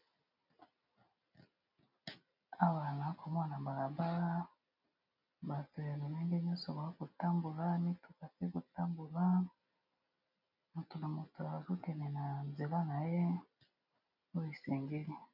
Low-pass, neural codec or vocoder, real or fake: 5.4 kHz; none; real